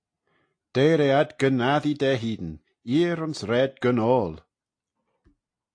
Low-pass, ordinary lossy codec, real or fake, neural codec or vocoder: 9.9 kHz; AAC, 48 kbps; real; none